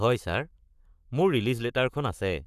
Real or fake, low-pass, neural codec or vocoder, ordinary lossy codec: fake; 14.4 kHz; codec, 44.1 kHz, 7.8 kbps, Pupu-Codec; none